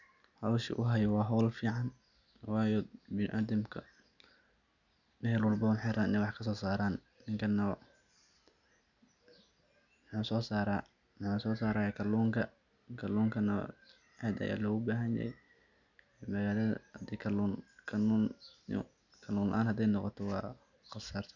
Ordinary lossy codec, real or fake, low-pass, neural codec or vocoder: none; real; 7.2 kHz; none